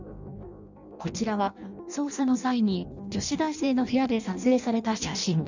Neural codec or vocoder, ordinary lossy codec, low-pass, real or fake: codec, 16 kHz in and 24 kHz out, 0.6 kbps, FireRedTTS-2 codec; none; 7.2 kHz; fake